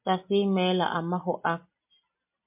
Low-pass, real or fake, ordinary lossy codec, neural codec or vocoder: 3.6 kHz; real; MP3, 32 kbps; none